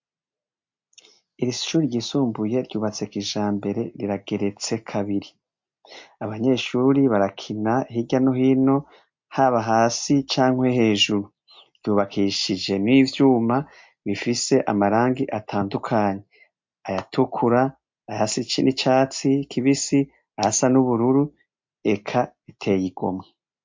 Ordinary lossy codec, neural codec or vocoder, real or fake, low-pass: MP3, 48 kbps; none; real; 7.2 kHz